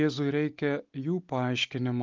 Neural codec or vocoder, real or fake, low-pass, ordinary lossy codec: none; real; 7.2 kHz; Opus, 32 kbps